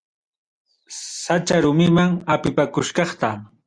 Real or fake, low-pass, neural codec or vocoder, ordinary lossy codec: real; 9.9 kHz; none; Opus, 64 kbps